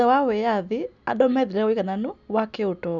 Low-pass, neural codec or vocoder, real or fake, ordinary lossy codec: 7.2 kHz; none; real; none